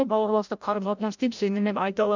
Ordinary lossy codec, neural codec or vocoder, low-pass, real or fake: none; codec, 16 kHz, 0.5 kbps, FreqCodec, larger model; 7.2 kHz; fake